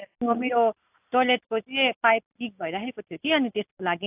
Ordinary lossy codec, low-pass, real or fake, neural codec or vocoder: AAC, 32 kbps; 3.6 kHz; real; none